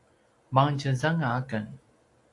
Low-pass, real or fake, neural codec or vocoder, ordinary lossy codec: 10.8 kHz; real; none; AAC, 48 kbps